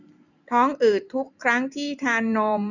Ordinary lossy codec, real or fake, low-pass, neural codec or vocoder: none; fake; 7.2 kHz; vocoder, 44.1 kHz, 128 mel bands every 256 samples, BigVGAN v2